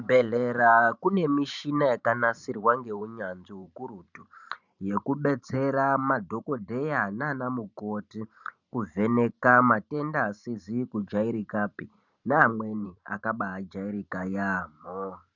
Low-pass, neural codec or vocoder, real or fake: 7.2 kHz; vocoder, 44.1 kHz, 128 mel bands every 512 samples, BigVGAN v2; fake